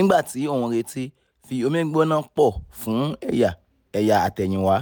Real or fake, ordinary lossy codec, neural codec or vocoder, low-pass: real; none; none; none